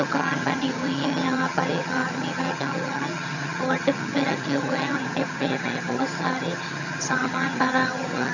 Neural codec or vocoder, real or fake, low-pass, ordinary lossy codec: vocoder, 22.05 kHz, 80 mel bands, HiFi-GAN; fake; 7.2 kHz; none